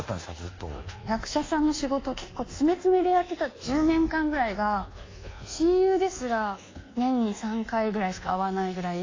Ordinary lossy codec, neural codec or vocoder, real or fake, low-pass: AAC, 32 kbps; codec, 24 kHz, 1.2 kbps, DualCodec; fake; 7.2 kHz